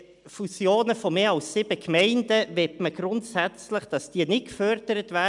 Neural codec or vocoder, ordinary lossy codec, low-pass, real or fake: none; MP3, 96 kbps; 10.8 kHz; real